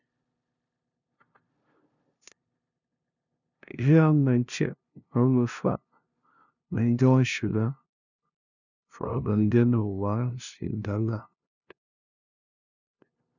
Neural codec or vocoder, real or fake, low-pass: codec, 16 kHz, 0.5 kbps, FunCodec, trained on LibriTTS, 25 frames a second; fake; 7.2 kHz